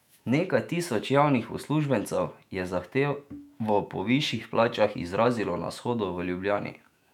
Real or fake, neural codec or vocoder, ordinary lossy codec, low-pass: fake; autoencoder, 48 kHz, 128 numbers a frame, DAC-VAE, trained on Japanese speech; none; 19.8 kHz